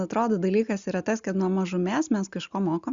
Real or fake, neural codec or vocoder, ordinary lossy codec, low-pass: real; none; Opus, 64 kbps; 7.2 kHz